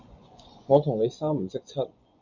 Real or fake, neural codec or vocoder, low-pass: fake; vocoder, 24 kHz, 100 mel bands, Vocos; 7.2 kHz